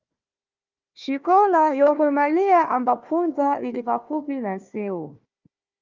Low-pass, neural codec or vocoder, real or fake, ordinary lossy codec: 7.2 kHz; codec, 16 kHz, 1 kbps, FunCodec, trained on Chinese and English, 50 frames a second; fake; Opus, 24 kbps